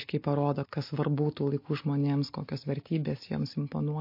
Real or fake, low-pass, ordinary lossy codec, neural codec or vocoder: real; 5.4 kHz; MP3, 32 kbps; none